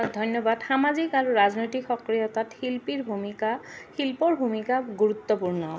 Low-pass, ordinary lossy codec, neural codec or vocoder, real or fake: none; none; none; real